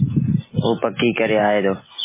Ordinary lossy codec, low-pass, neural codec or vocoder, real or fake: MP3, 16 kbps; 3.6 kHz; none; real